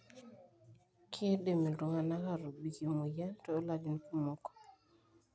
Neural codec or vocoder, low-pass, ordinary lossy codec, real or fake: none; none; none; real